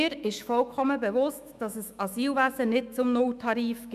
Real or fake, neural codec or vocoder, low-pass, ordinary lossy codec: fake; autoencoder, 48 kHz, 128 numbers a frame, DAC-VAE, trained on Japanese speech; 14.4 kHz; none